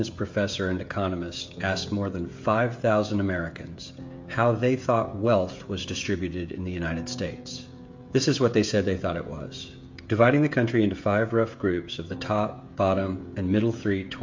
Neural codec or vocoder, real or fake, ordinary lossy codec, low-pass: codec, 16 kHz, 16 kbps, FreqCodec, smaller model; fake; MP3, 48 kbps; 7.2 kHz